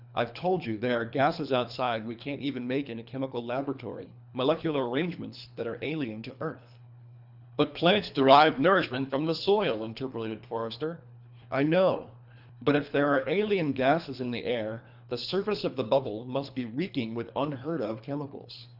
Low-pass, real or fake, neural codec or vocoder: 5.4 kHz; fake; codec, 24 kHz, 3 kbps, HILCodec